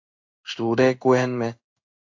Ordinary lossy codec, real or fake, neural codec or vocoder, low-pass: AAC, 48 kbps; fake; codec, 16 kHz in and 24 kHz out, 1 kbps, XY-Tokenizer; 7.2 kHz